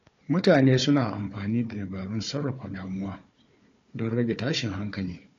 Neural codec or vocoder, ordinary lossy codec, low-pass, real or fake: codec, 16 kHz, 4 kbps, FunCodec, trained on Chinese and English, 50 frames a second; AAC, 48 kbps; 7.2 kHz; fake